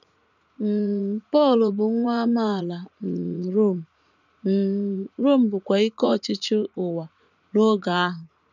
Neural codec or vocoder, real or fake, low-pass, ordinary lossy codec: vocoder, 44.1 kHz, 80 mel bands, Vocos; fake; 7.2 kHz; none